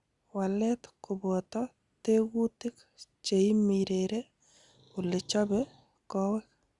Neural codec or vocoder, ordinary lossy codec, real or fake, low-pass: none; Opus, 64 kbps; real; 10.8 kHz